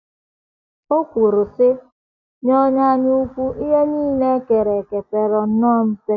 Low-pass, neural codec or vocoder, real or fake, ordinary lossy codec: 7.2 kHz; none; real; none